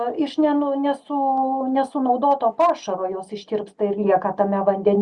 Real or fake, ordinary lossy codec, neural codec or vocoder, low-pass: real; Opus, 64 kbps; none; 10.8 kHz